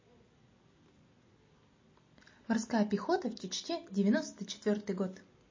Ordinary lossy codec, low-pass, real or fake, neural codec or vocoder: MP3, 32 kbps; 7.2 kHz; real; none